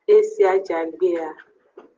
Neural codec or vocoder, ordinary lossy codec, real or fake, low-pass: none; Opus, 16 kbps; real; 7.2 kHz